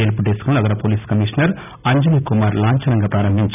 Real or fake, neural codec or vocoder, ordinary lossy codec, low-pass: real; none; none; 3.6 kHz